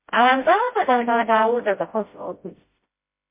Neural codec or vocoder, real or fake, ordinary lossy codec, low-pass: codec, 16 kHz, 0.5 kbps, FreqCodec, smaller model; fake; MP3, 24 kbps; 3.6 kHz